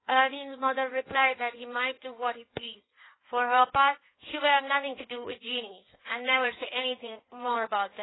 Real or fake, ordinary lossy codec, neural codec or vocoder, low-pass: fake; AAC, 16 kbps; codec, 16 kHz in and 24 kHz out, 1.1 kbps, FireRedTTS-2 codec; 7.2 kHz